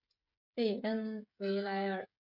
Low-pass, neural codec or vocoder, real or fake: 5.4 kHz; codec, 16 kHz, 16 kbps, FreqCodec, smaller model; fake